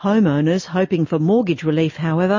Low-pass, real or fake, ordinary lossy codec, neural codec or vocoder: 7.2 kHz; real; MP3, 32 kbps; none